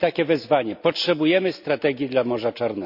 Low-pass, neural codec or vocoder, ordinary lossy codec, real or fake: 5.4 kHz; none; none; real